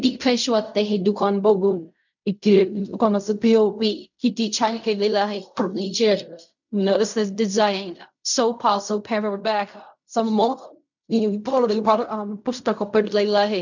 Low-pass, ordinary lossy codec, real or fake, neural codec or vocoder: 7.2 kHz; none; fake; codec, 16 kHz in and 24 kHz out, 0.4 kbps, LongCat-Audio-Codec, fine tuned four codebook decoder